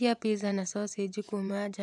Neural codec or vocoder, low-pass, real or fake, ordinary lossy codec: vocoder, 24 kHz, 100 mel bands, Vocos; none; fake; none